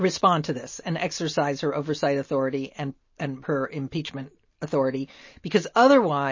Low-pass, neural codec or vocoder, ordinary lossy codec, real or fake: 7.2 kHz; none; MP3, 32 kbps; real